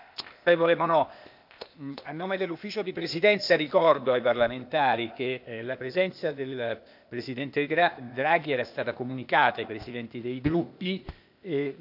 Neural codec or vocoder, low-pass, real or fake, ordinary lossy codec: codec, 16 kHz, 0.8 kbps, ZipCodec; 5.4 kHz; fake; none